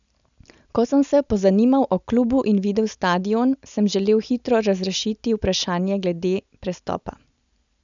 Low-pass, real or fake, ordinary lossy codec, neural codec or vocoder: 7.2 kHz; real; none; none